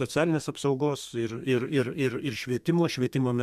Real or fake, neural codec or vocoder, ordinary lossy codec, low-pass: fake; codec, 32 kHz, 1.9 kbps, SNAC; MP3, 96 kbps; 14.4 kHz